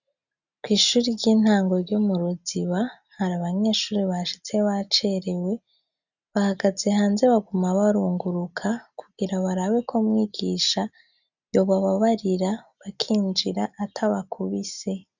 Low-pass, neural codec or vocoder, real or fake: 7.2 kHz; none; real